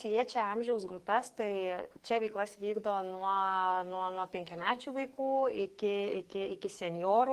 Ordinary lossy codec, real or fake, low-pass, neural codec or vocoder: Opus, 24 kbps; fake; 14.4 kHz; codec, 44.1 kHz, 2.6 kbps, SNAC